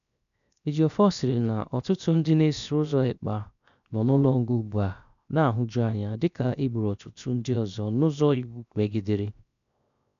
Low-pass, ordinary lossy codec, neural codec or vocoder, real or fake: 7.2 kHz; none; codec, 16 kHz, 0.7 kbps, FocalCodec; fake